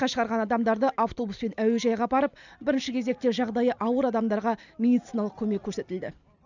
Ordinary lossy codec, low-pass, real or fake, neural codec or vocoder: none; 7.2 kHz; real; none